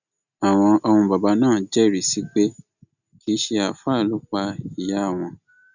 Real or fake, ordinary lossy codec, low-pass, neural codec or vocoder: real; none; 7.2 kHz; none